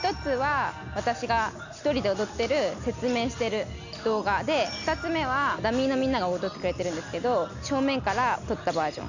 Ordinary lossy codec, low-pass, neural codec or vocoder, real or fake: none; 7.2 kHz; none; real